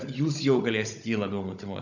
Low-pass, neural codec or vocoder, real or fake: 7.2 kHz; codec, 16 kHz, 16 kbps, FunCodec, trained on Chinese and English, 50 frames a second; fake